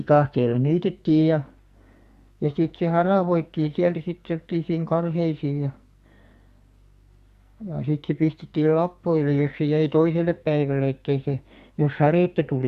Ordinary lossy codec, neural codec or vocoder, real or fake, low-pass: Opus, 64 kbps; codec, 44.1 kHz, 2.6 kbps, SNAC; fake; 14.4 kHz